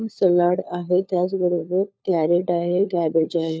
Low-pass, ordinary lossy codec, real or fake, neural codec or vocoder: none; none; fake; codec, 16 kHz, 4 kbps, FunCodec, trained on LibriTTS, 50 frames a second